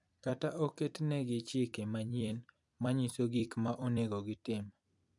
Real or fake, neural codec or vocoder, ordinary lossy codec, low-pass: fake; vocoder, 44.1 kHz, 128 mel bands every 256 samples, BigVGAN v2; none; 10.8 kHz